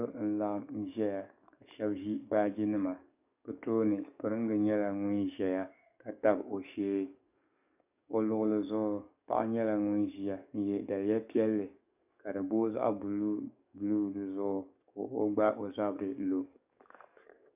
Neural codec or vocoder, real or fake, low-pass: codec, 44.1 kHz, 7.8 kbps, DAC; fake; 3.6 kHz